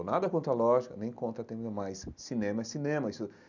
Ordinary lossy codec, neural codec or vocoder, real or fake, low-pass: none; none; real; 7.2 kHz